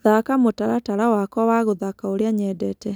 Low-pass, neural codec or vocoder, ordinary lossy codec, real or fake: none; none; none; real